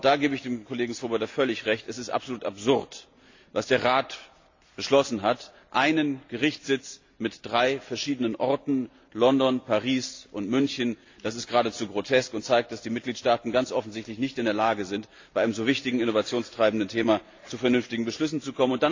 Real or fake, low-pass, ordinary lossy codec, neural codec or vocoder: real; 7.2 kHz; AAC, 48 kbps; none